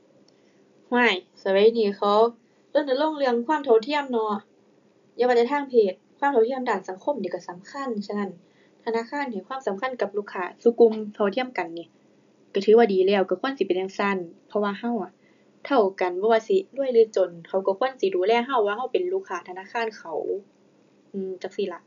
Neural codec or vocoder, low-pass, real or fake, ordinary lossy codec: none; 7.2 kHz; real; none